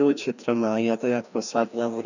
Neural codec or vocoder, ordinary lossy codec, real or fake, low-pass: codec, 16 kHz, 1 kbps, FreqCodec, larger model; none; fake; 7.2 kHz